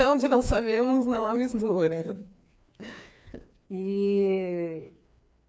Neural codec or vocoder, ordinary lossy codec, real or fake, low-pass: codec, 16 kHz, 2 kbps, FreqCodec, larger model; none; fake; none